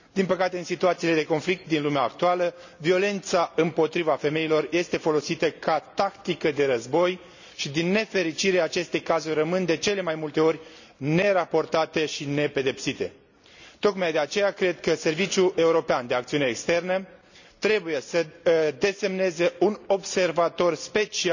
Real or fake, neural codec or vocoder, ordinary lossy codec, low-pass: real; none; none; 7.2 kHz